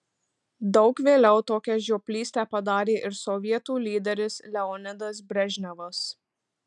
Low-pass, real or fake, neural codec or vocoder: 10.8 kHz; real; none